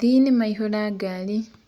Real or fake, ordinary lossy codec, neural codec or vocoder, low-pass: real; Opus, 64 kbps; none; 19.8 kHz